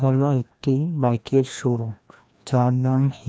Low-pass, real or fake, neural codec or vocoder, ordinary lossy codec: none; fake; codec, 16 kHz, 1 kbps, FreqCodec, larger model; none